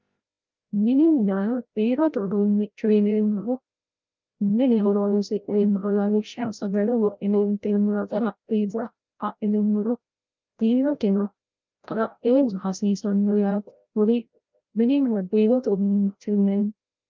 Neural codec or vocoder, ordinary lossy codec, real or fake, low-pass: codec, 16 kHz, 0.5 kbps, FreqCodec, larger model; Opus, 32 kbps; fake; 7.2 kHz